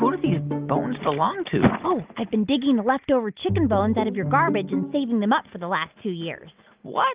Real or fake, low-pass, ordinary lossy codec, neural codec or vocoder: real; 3.6 kHz; Opus, 32 kbps; none